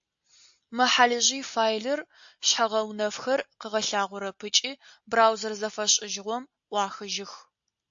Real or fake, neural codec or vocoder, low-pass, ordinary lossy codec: real; none; 7.2 kHz; AAC, 64 kbps